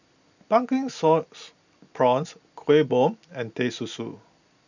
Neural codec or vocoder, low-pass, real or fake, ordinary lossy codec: none; 7.2 kHz; real; none